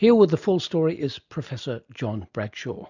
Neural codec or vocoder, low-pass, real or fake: none; 7.2 kHz; real